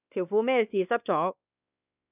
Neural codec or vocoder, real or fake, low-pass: codec, 16 kHz, 2 kbps, X-Codec, WavLM features, trained on Multilingual LibriSpeech; fake; 3.6 kHz